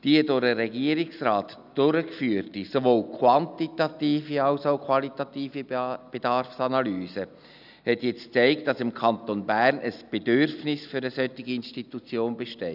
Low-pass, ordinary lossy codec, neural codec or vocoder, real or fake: 5.4 kHz; none; none; real